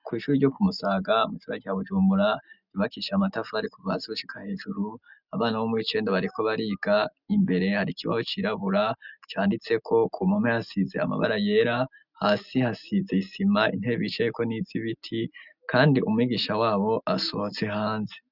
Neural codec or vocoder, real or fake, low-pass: none; real; 5.4 kHz